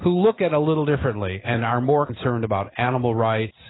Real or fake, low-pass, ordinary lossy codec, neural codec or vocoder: real; 7.2 kHz; AAC, 16 kbps; none